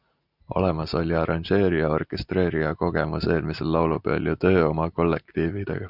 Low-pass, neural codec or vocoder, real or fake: 5.4 kHz; none; real